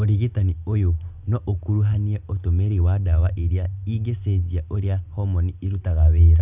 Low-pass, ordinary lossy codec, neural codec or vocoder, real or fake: 3.6 kHz; none; none; real